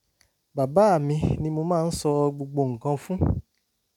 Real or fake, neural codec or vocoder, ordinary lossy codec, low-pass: real; none; none; 19.8 kHz